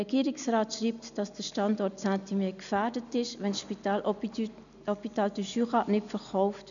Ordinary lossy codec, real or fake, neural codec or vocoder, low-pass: none; real; none; 7.2 kHz